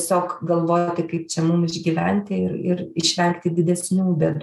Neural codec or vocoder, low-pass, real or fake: none; 14.4 kHz; real